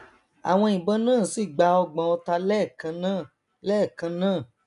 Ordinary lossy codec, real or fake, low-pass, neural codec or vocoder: none; real; 10.8 kHz; none